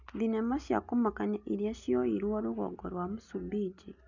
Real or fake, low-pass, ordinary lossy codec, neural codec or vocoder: real; 7.2 kHz; none; none